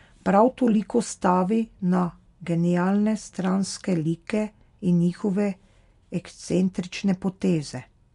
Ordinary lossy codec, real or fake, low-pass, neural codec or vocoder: MP3, 64 kbps; real; 10.8 kHz; none